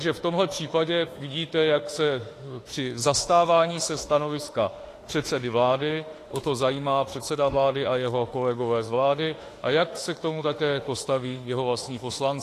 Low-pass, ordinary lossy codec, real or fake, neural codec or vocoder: 14.4 kHz; AAC, 48 kbps; fake; autoencoder, 48 kHz, 32 numbers a frame, DAC-VAE, trained on Japanese speech